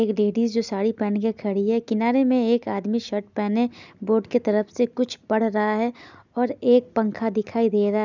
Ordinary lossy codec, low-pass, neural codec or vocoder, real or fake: none; 7.2 kHz; none; real